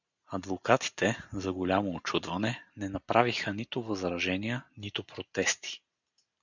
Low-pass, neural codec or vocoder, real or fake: 7.2 kHz; none; real